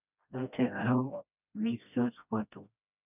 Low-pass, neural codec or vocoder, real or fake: 3.6 kHz; codec, 16 kHz, 1 kbps, FreqCodec, smaller model; fake